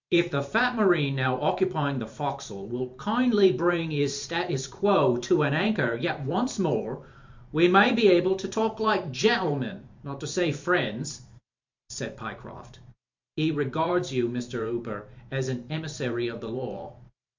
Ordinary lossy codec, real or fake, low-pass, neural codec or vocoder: MP3, 64 kbps; fake; 7.2 kHz; vocoder, 44.1 kHz, 128 mel bands every 512 samples, BigVGAN v2